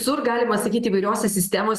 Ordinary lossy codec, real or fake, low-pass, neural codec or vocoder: Opus, 64 kbps; real; 14.4 kHz; none